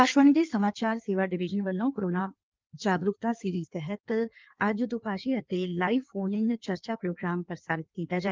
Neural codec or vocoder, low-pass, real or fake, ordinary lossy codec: codec, 16 kHz in and 24 kHz out, 1.1 kbps, FireRedTTS-2 codec; 7.2 kHz; fake; Opus, 32 kbps